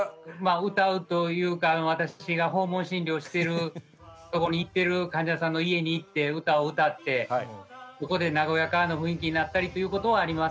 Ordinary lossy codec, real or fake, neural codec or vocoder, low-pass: none; real; none; none